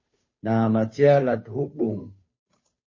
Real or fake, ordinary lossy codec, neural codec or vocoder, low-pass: fake; MP3, 32 kbps; codec, 16 kHz, 2 kbps, FunCodec, trained on Chinese and English, 25 frames a second; 7.2 kHz